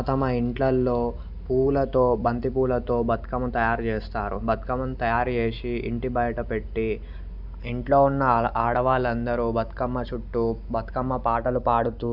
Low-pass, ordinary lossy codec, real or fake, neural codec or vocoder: 5.4 kHz; none; real; none